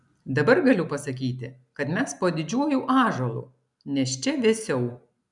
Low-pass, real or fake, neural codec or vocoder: 10.8 kHz; real; none